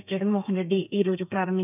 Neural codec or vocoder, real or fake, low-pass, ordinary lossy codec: codec, 32 kHz, 1.9 kbps, SNAC; fake; 3.6 kHz; none